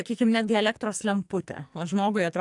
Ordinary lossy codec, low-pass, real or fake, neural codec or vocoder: AAC, 64 kbps; 10.8 kHz; fake; codec, 44.1 kHz, 2.6 kbps, SNAC